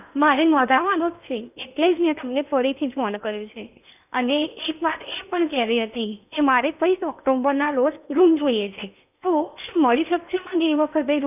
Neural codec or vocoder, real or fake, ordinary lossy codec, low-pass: codec, 16 kHz in and 24 kHz out, 0.8 kbps, FocalCodec, streaming, 65536 codes; fake; none; 3.6 kHz